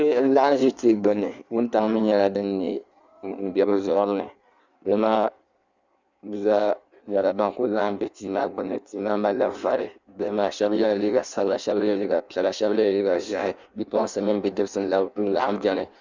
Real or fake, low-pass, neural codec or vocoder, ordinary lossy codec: fake; 7.2 kHz; codec, 16 kHz in and 24 kHz out, 1.1 kbps, FireRedTTS-2 codec; Opus, 64 kbps